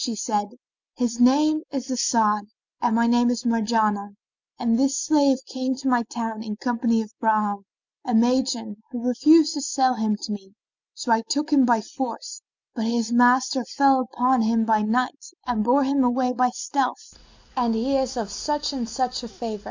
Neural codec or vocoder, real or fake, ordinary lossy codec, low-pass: none; real; MP3, 64 kbps; 7.2 kHz